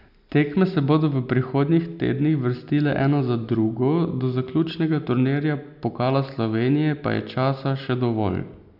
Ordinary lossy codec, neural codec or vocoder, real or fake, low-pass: none; none; real; 5.4 kHz